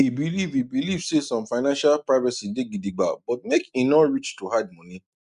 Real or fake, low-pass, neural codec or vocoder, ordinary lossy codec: real; 10.8 kHz; none; none